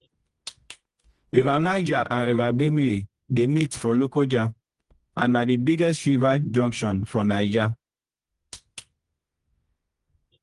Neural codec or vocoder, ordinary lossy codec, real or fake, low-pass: codec, 24 kHz, 0.9 kbps, WavTokenizer, medium music audio release; Opus, 24 kbps; fake; 10.8 kHz